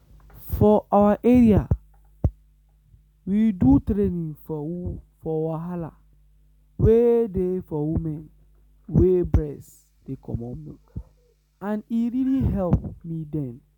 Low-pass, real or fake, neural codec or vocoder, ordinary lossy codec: 19.8 kHz; real; none; none